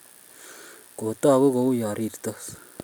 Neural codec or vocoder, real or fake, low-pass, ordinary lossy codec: none; real; none; none